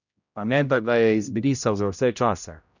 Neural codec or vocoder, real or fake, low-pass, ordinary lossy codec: codec, 16 kHz, 0.5 kbps, X-Codec, HuBERT features, trained on general audio; fake; 7.2 kHz; none